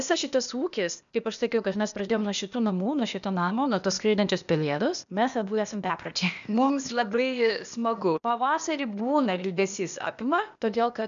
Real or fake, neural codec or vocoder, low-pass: fake; codec, 16 kHz, 0.8 kbps, ZipCodec; 7.2 kHz